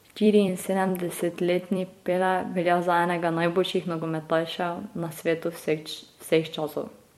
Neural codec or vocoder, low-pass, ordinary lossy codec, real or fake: vocoder, 44.1 kHz, 128 mel bands every 256 samples, BigVGAN v2; 19.8 kHz; MP3, 64 kbps; fake